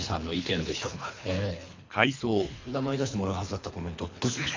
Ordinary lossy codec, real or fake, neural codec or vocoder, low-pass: MP3, 48 kbps; fake; codec, 24 kHz, 3 kbps, HILCodec; 7.2 kHz